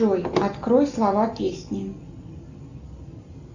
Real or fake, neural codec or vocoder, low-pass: real; none; 7.2 kHz